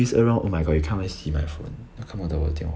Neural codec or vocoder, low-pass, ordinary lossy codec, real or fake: none; none; none; real